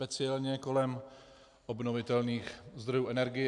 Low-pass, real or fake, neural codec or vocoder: 10.8 kHz; real; none